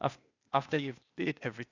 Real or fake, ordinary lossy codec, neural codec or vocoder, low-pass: fake; none; codec, 16 kHz, 0.8 kbps, ZipCodec; 7.2 kHz